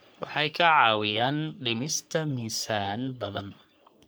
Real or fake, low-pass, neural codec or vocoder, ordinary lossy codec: fake; none; codec, 44.1 kHz, 3.4 kbps, Pupu-Codec; none